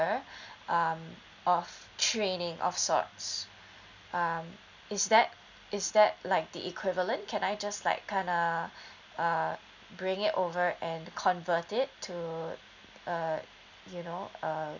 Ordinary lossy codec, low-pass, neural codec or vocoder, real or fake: none; 7.2 kHz; none; real